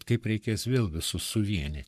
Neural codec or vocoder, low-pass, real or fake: codec, 44.1 kHz, 7.8 kbps, Pupu-Codec; 14.4 kHz; fake